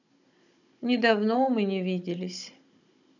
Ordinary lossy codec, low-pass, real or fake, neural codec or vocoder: none; 7.2 kHz; fake; codec, 16 kHz, 16 kbps, FunCodec, trained on Chinese and English, 50 frames a second